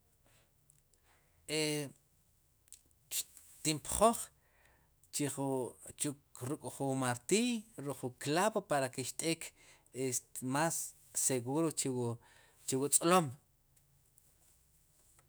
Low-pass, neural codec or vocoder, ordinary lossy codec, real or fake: none; autoencoder, 48 kHz, 128 numbers a frame, DAC-VAE, trained on Japanese speech; none; fake